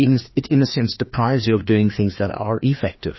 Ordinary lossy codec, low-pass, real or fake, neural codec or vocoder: MP3, 24 kbps; 7.2 kHz; fake; codec, 16 kHz, 2 kbps, FreqCodec, larger model